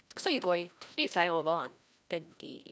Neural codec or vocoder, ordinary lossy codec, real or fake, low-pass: codec, 16 kHz, 1 kbps, FreqCodec, larger model; none; fake; none